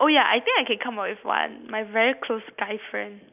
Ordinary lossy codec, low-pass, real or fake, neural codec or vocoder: none; 3.6 kHz; real; none